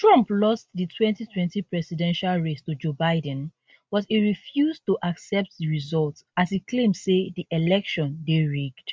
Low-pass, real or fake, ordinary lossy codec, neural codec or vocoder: none; real; none; none